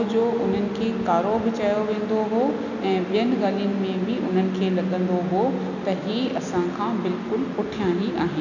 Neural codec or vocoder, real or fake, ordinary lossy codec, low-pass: none; real; none; 7.2 kHz